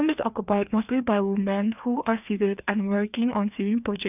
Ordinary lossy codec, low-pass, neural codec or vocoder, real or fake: none; 3.6 kHz; codec, 16 kHz, 2 kbps, FreqCodec, larger model; fake